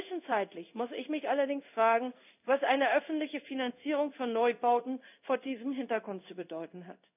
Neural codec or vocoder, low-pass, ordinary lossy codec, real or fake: codec, 16 kHz in and 24 kHz out, 1 kbps, XY-Tokenizer; 3.6 kHz; none; fake